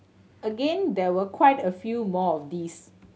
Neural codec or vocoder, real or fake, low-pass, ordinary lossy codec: none; real; none; none